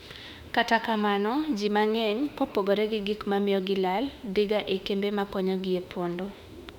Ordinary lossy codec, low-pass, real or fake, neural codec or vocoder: none; 19.8 kHz; fake; autoencoder, 48 kHz, 32 numbers a frame, DAC-VAE, trained on Japanese speech